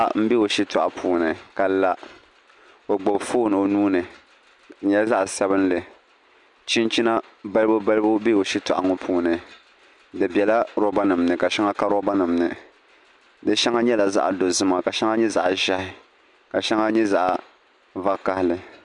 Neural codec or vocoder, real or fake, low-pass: none; real; 10.8 kHz